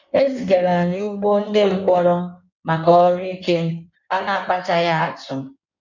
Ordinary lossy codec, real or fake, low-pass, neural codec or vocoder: none; fake; 7.2 kHz; codec, 16 kHz in and 24 kHz out, 1.1 kbps, FireRedTTS-2 codec